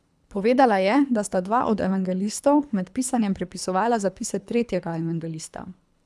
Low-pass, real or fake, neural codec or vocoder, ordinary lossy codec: none; fake; codec, 24 kHz, 3 kbps, HILCodec; none